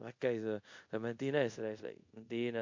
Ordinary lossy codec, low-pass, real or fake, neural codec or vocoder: MP3, 48 kbps; 7.2 kHz; fake; codec, 24 kHz, 0.5 kbps, DualCodec